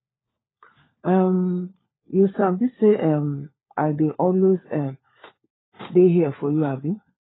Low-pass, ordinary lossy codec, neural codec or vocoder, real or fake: 7.2 kHz; AAC, 16 kbps; codec, 16 kHz, 4 kbps, FunCodec, trained on LibriTTS, 50 frames a second; fake